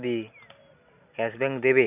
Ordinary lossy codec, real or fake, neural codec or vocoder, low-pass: none; real; none; 3.6 kHz